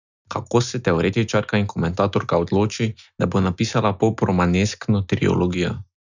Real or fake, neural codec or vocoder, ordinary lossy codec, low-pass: real; none; none; 7.2 kHz